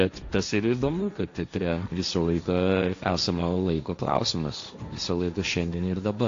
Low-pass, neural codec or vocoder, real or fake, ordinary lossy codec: 7.2 kHz; codec, 16 kHz, 1.1 kbps, Voila-Tokenizer; fake; AAC, 48 kbps